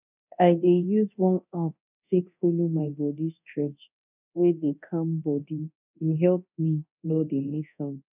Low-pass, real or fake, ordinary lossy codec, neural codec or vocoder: 3.6 kHz; fake; none; codec, 24 kHz, 0.9 kbps, DualCodec